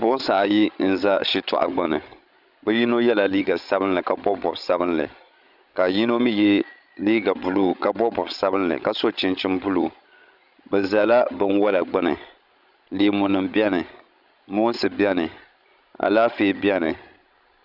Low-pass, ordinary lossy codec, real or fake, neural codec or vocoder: 5.4 kHz; Opus, 64 kbps; fake; codec, 16 kHz, 16 kbps, FreqCodec, larger model